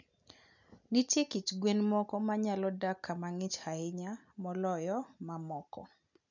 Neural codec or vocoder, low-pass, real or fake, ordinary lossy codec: none; 7.2 kHz; real; none